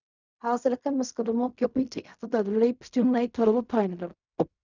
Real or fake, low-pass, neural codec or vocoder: fake; 7.2 kHz; codec, 16 kHz in and 24 kHz out, 0.4 kbps, LongCat-Audio-Codec, fine tuned four codebook decoder